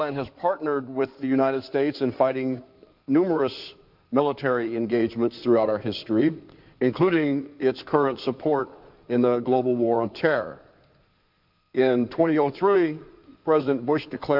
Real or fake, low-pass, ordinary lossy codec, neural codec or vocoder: fake; 5.4 kHz; MP3, 48 kbps; codec, 16 kHz in and 24 kHz out, 2.2 kbps, FireRedTTS-2 codec